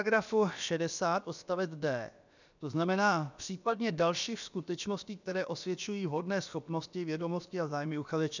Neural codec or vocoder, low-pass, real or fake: codec, 16 kHz, about 1 kbps, DyCAST, with the encoder's durations; 7.2 kHz; fake